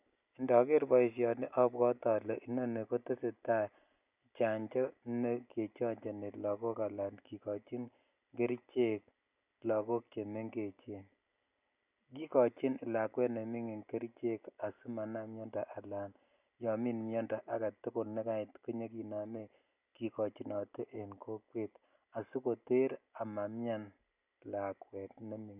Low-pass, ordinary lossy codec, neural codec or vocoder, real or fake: 3.6 kHz; none; none; real